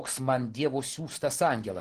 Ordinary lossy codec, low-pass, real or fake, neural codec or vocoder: Opus, 16 kbps; 14.4 kHz; real; none